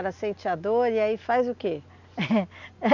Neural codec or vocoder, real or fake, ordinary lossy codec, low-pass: none; real; none; 7.2 kHz